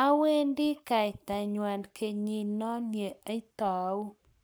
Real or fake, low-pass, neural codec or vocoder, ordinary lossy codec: fake; none; codec, 44.1 kHz, 7.8 kbps, Pupu-Codec; none